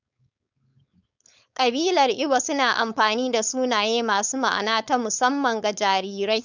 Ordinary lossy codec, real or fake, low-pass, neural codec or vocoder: none; fake; 7.2 kHz; codec, 16 kHz, 4.8 kbps, FACodec